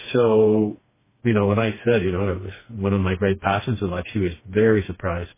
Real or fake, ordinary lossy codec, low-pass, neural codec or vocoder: fake; MP3, 16 kbps; 3.6 kHz; codec, 16 kHz, 2 kbps, FreqCodec, smaller model